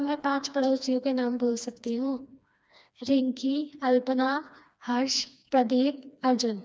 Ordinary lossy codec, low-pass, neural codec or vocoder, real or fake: none; none; codec, 16 kHz, 2 kbps, FreqCodec, smaller model; fake